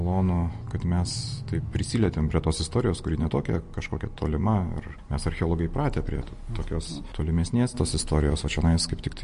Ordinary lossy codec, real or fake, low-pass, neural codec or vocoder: MP3, 48 kbps; real; 10.8 kHz; none